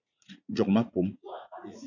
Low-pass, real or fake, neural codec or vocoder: 7.2 kHz; fake; vocoder, 44.1 kHz, 80 mel bands, Vocos